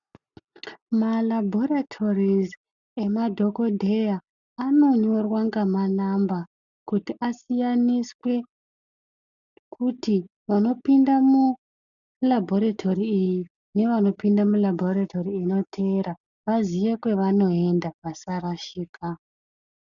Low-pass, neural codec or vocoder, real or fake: 7.2 kHz; none; real